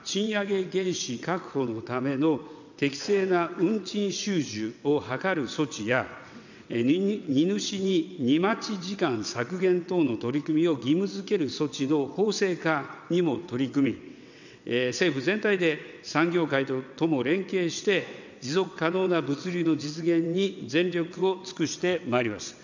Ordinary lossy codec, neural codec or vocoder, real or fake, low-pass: none; vocoder, 22.05 kHz, 80 mel bands, WaveNeXt; fake; 7.2 kHz